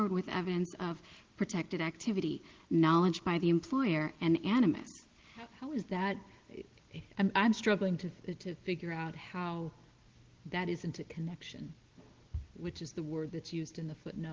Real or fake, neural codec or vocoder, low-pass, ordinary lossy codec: real; none; 7.2 kHz; Opus, 16 kbps